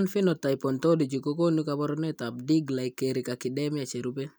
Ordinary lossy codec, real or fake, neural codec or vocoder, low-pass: none; real; none; none